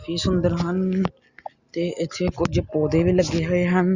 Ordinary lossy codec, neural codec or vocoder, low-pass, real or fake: Opus, 64 kbps; none; 7.2 kHz; real